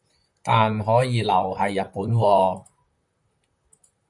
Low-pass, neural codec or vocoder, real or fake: 10.8 kHz; vocoder, 44.1 kHz, 128 mel bands, Pupu-Vocoder; fake